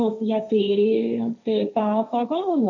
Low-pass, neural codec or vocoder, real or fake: 7.2 kHz; codec, 16 kHz, 1.1 kbps, Voila-Tokenizer; fake